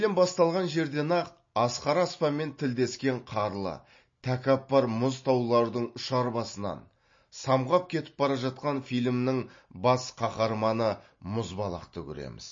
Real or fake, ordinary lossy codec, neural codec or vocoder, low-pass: real; MP3, 32 kbps; none; 7.2 kHz